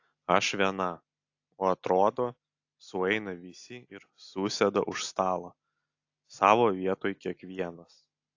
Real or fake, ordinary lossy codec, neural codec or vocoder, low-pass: real; AAC, 48 kbps; none; 7.2 kHz